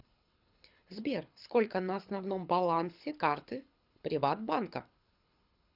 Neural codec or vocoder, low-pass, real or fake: codec, 24 kHz, 6 kbps, HILCodec; 5.4 kHz; fake